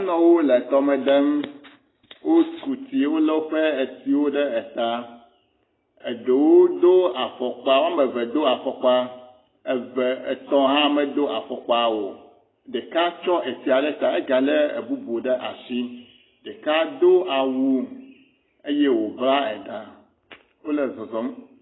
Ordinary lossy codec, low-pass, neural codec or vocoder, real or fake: AAC, 16 kbps; 7.2 kHz; none; real